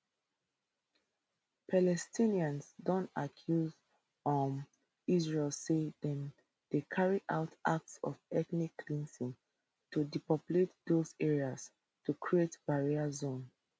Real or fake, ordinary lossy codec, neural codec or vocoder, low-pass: real; none; none; none